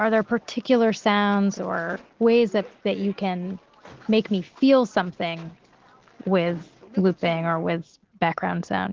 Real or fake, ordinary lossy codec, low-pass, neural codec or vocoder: real; Opus, 16 kbps; 7.2 kHz; none